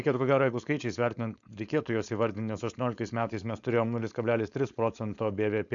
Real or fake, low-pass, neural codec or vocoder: fake; 7.2 kHz; codec, 16 kHz, 4.8 kbps, FACodec